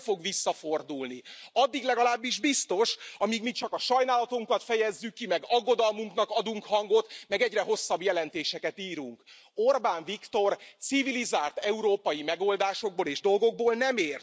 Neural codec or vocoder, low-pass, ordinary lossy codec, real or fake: none; none; none; real